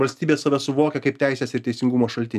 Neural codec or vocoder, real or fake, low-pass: none; real; 14.4 kHz